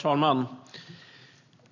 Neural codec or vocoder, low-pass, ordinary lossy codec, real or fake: none; 7.2 kHz; none; real